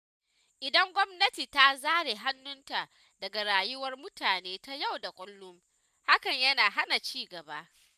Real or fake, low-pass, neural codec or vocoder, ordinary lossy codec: real; 14.4 kHz; none; none